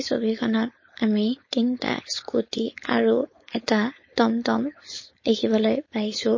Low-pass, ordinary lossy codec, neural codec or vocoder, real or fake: 7.2 kHz; MP3, 32 kbps; codec, 16 kHz, 4.8 kbps, FACodec; fake